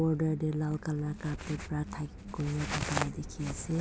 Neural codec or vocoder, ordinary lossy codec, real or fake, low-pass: none; none; real; none